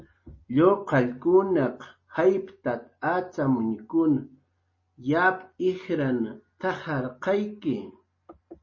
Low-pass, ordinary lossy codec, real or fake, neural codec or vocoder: 7.2 kHz; MP3, 32 kbps; real; none